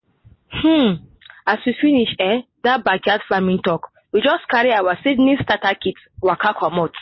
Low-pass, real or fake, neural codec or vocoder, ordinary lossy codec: 7.2 kHz; real; none; MP3, 24 kbps